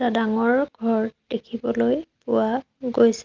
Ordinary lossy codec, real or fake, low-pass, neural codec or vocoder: Opus, 32 kbps; real; 7.2 kHz; none